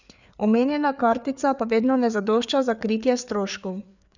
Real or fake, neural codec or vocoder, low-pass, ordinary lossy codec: fake; codec, 16 kHz, 4 kbps, FreqCodec, larger model; 7.2 kHz; none